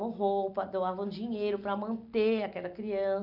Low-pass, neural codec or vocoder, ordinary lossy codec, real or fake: 5.4 kHz; none; none; real